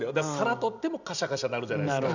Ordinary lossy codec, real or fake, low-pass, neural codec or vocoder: none; real; 7.2 kHz; none